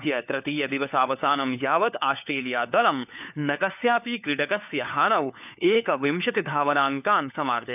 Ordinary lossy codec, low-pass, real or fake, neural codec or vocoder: none; 3.6 kHz; fake; codec, 16 kHz, 4 kbps, FunCodec, trained on LibriTTS, 50 frames a second